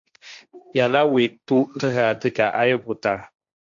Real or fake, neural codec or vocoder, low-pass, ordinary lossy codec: fake; codec, 16 kHz, 1.1 kbps, Voila-Tokenizer; 7.2 kHz; MP3, 64 kbps